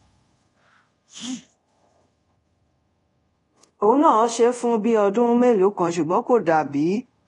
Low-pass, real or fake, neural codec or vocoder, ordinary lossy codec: 10.8 kHz; fake; codec, 24 kHz, 0.5 kbps, DualCodec; AAC, 32 kbps